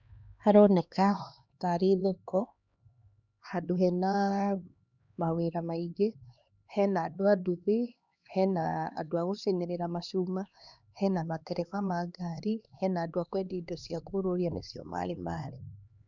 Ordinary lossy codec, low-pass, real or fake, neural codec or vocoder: none; 7.2 kHz; fake; codec, 16 kHz, 2 kbps, X-Codec, HuBERT features, trained on LibriSpeech